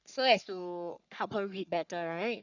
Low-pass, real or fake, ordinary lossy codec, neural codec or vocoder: 7.2 kHz; fake; none; codec, 44.1 kHz, 3.4 kbps, Pupu-Codec